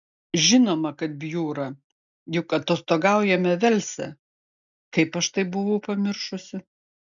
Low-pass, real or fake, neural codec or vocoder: 7.2 kHz; real; none